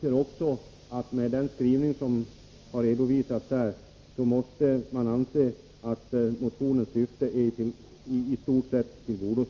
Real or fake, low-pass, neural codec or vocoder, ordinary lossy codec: real; 7.2 kHz; none; Opus, 32 kbps